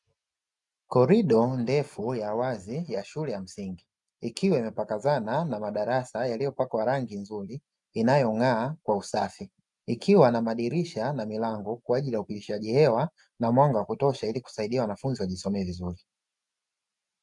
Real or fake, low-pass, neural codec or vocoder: real; 10.8 kHz; none